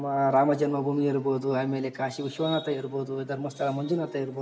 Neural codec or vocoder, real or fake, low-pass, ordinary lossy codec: none; real; none; none